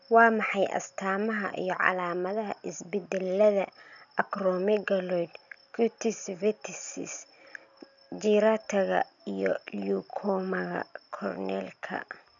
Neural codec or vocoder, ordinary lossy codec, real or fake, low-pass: none; none; real; 7.2 kHz